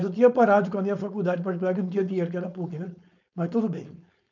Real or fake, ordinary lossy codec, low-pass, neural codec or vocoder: fake; none; 7.2 kHz; codec, 16 kHz, 4.8 kbps, FACodec